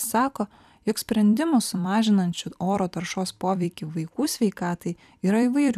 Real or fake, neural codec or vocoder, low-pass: fake; vocoder, 44.1 kHz, 128 mel bands every 256 samples, BigVGAN v2; 14.4 kHz